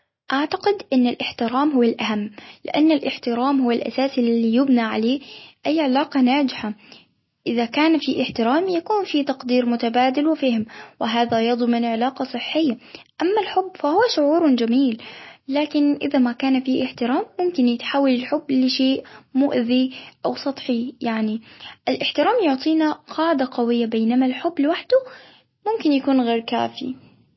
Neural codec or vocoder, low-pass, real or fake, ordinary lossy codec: none; 7.2 kHz; real; MP3, 24 kbps